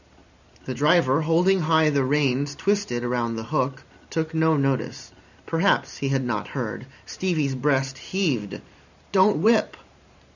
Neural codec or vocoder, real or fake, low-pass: vocoder, 44.1 kHz, 128 mel bands every 512 samples, BigVGAN v2; fake; 7.2 kHz